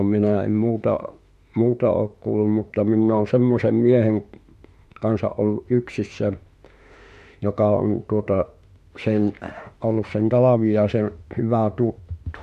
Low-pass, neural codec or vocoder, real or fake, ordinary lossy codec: 14.4 kHz; autoencoder, 48 kHz, 32 numbers a frame, DAC-VAE, trained on Japanese speech; fake; MP3, 64 kbps